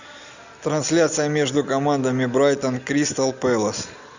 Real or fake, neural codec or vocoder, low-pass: real; none; 7.2 kHz